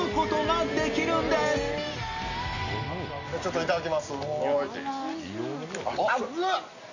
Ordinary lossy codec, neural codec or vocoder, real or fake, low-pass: none; none; real; 7.2 kHz